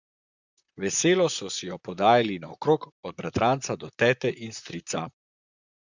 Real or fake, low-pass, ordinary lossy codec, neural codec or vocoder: fake; 7.2 kHz; Opus, 64 kbps; vocoder, 44.1 kHz, 128 mel bands, Pupu-Vocoder